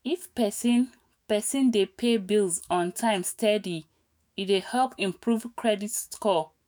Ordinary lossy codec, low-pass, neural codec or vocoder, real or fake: none; none; autoencoder, 48 kHz, 128 numbers a frame, DAC-VAE, trained on Japanese speech; fake